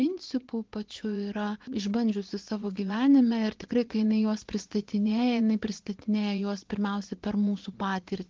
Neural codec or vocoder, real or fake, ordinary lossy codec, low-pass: vocoder, 44.1 kHz, 80 mel bands, Vocos; fake; Opus, 16 kbps; 7.2 kHz